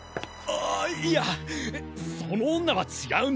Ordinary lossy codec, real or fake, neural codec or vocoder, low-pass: none; real; none; none